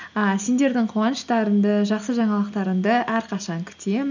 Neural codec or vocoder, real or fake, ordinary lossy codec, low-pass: none; real; none; 7.2 kHz